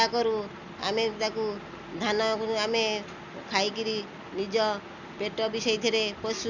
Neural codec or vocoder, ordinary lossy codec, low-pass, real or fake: none; none; 7.2 kHz; real